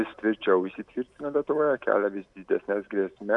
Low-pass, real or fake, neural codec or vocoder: 10.8 kHz; real; none